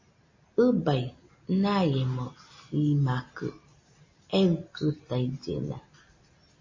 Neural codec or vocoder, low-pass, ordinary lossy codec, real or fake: none; 7.2 kHz; MP3, 32 kbps; real